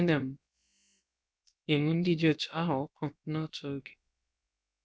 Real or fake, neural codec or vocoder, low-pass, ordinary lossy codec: fake; codec, 16 kHz, about 1 kbps, DyCAST, with the encoder's durations; none; none